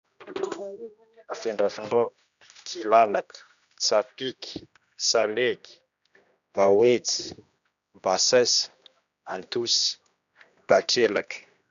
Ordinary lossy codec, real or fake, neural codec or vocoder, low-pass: none; fake; codec, 16 kHz, 1 kbps, X-Codec, HuBERT features, trained on general audio; 7.2 kHz